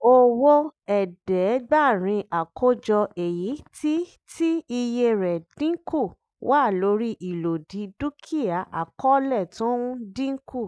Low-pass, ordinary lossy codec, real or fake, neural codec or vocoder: none; none; real; none